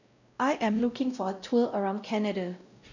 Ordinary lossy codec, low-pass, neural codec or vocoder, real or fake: none; 7.2 kHz; codec, 16 kHz, 0.5 kbps, X-Codec, WavLM features, trained on Multilingual LibriSpeech; fake